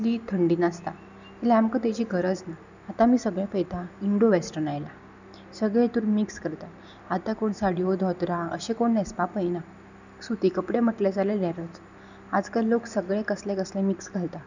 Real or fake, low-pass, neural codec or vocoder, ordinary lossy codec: real; 7.2 kHz; none; none